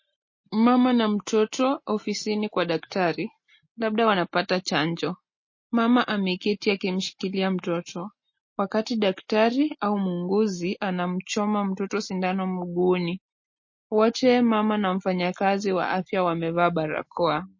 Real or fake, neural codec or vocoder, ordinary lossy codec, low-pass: real; none; MP3, 32 kbps; 7.2 kHz